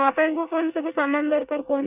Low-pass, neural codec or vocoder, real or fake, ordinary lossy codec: 3.6 kHz; codec, 24 kHz, 1 kbps, SNAC; fake; none